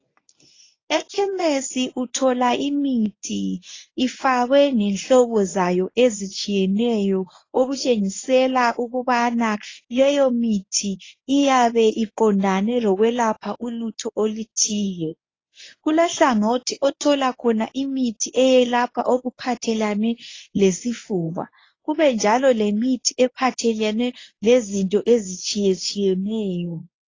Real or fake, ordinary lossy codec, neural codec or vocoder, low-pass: fake; AAC, 32 kbps; codec, 24 kHz, 0.9 kbps, WavTokenizer, medium speech release version 1; 7.2 kHz